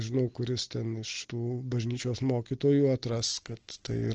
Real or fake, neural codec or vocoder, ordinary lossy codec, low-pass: real; none; Opus, 16 kbps; 7.2 kHz